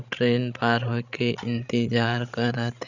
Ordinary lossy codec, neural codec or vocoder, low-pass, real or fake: none; codec, 16 kHz, 16 kbps, FreqCodec, larger model; 7.2 kHz; fake